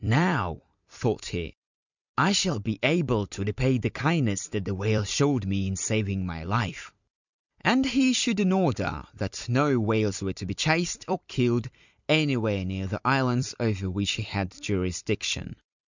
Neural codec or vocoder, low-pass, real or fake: vocoder, 44.1 kHz, 128 mel bands every 256 samples, BigVGAN v2; 7.2 kHz; fake